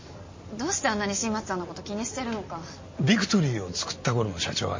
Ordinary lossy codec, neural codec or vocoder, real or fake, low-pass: MP3, 32 kbps; none; real; 7.2 kHz